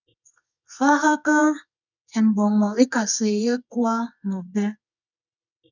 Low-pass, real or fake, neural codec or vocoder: 7.2 kHz; fake; codec, 24 kHz, 0.9 kbps, WavTokenizer, medium music audio release